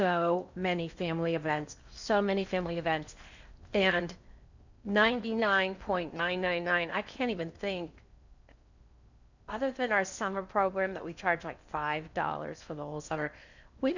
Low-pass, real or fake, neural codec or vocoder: 7.2 kHz; fake; codec, 16 kHz in and 24 kHz out, 0.8 kbps, FocalCodec, streaming, 65536 codes